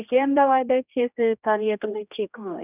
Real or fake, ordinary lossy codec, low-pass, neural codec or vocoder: fake; none; 3.6 kHz; codec, 16 kHz, 1 kbps, X-Codec, HuBERT features, trained on general audio